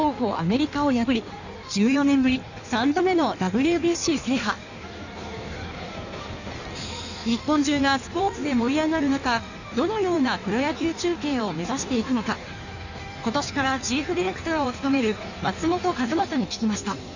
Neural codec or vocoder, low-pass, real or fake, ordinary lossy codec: codec, 16 kHz in and 24 kHz out, 1.1 kbps, FireRedTTS-2 codec; 7.2 kHz; fake; none